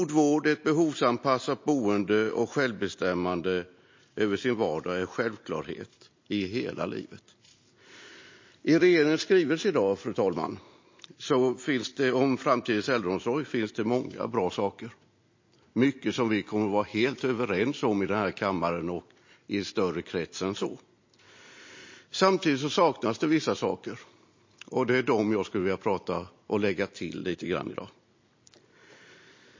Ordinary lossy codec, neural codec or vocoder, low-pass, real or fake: MP3, 32 kbps; none; 7.2 kHz; real